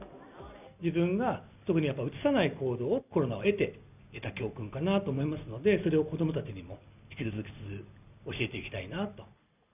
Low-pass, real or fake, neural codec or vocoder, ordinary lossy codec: 3.6 kHz; real; none; none